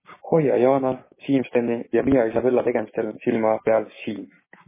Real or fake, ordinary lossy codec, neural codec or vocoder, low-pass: fake; MP3, 16 kbps; codec, 16 kHz, 6 kbps, DAC; 3.6 kHz